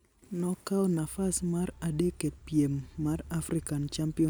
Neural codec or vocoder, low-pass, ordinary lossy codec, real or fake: none; none; none; real